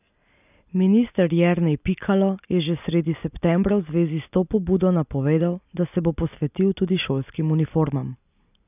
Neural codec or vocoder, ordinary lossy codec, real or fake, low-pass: none; none; real; 3.6 kHz